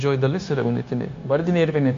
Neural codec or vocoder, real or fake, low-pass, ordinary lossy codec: codec, 16 kHz, 0.9 kbps, LongCat-Audio-Codec; fake; 7.2 kHz; AAC, 48 kbps